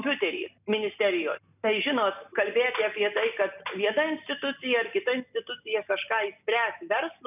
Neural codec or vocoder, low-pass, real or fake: none; 3.6 kHz; real